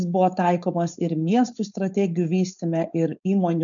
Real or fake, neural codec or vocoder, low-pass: fake; codec, 16 kHz, 4.8 kbps, FACodec; 7.2 kHz